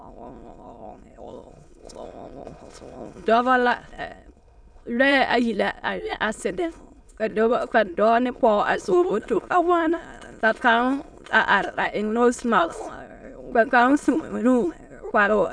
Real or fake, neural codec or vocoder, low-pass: fake; autoencoder, 22.05 kHz, a latent of 192 numbers a frame, VITS, trained on many speakers; 9.9 kHz